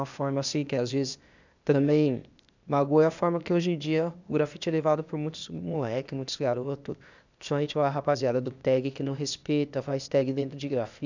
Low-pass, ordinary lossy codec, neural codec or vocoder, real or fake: 7.2 kHz; none; codec, 16 kHz, 0.8 kbps, ZipCodec; fake